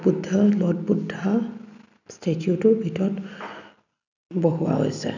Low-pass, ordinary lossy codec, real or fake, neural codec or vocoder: 7.2 kHz; none; real; none